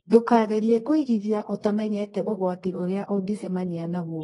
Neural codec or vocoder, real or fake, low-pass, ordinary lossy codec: codec, 24 kHz, 0.9 kbps, WavTokenizer, medium music audio release; fake; 10.8 kHz; AAC, 32 kbps